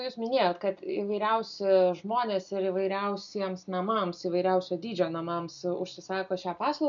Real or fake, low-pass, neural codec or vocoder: real; 7.2 kHz; none